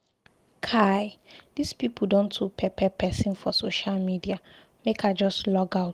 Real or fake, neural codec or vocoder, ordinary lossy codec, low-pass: real; none; Opus, 24 kbps; 14.4 kHz